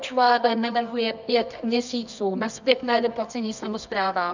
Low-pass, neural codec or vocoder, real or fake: 7.2 kHz; codec, 24 kHz, 0.9 kbps, WavTokenizer, medium music audio release; fake